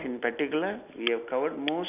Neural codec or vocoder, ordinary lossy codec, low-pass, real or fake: none; none; 3.6 kHz; real